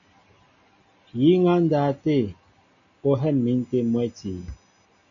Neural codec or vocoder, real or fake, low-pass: none; real; 7.2 kHz